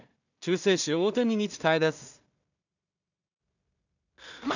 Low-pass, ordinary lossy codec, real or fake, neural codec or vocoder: 7.2 kHz; none; fake; codec, 16 kHz in and 24 kHz out, 0.4 kbps, LongCat-Audio-Codec, two codebook decoder